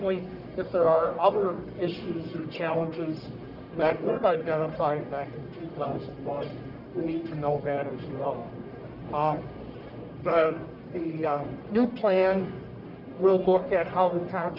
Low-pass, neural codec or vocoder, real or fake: 5.4 kHz; codec, 44.1 kHz, 1.7 kbps, Pupu-Codec; fake